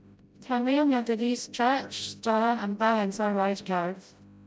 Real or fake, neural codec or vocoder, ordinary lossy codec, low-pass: fake; codec, 16 kHz, 0.5 kbps, FreqCodec, smaller model; none; none